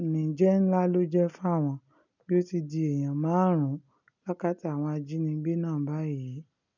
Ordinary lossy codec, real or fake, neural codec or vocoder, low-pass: none; real; none; 7.2 kHz